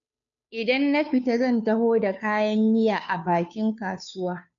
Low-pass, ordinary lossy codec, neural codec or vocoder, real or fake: 7.2 kHz; none; codec, 16 kHz, 2 kbps, FunCodec, trained on Chinese and English, 25 frames a second; fake